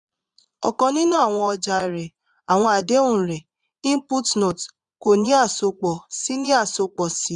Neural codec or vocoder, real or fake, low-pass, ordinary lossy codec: vocoder, 22.05 kHz, 80 mel bands, Vocos; fake; 9.9 kHz; none